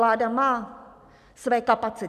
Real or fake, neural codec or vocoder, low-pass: real; none; 14.4 kHz